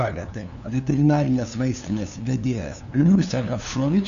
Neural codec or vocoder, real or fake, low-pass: codec, 16 kHz, 2 kbps, FunCodec, trained on LibriTTS, 25 frames a second; fake; 7.2 kHz